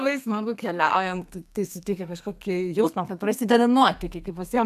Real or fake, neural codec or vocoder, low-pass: fake; codec, 32 kHz, 1.9 kbps, SNAC; 14.4 kHz